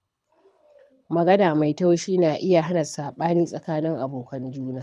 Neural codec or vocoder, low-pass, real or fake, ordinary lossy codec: codec, 24 kHz, 6 kbps, HILCodec; none; fake; none